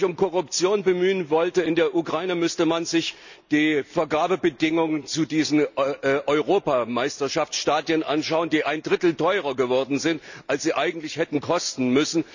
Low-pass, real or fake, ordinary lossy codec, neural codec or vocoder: 7.2 kHz; real; none; none